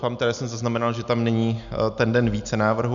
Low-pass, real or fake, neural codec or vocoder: 7.2 kHz; real; none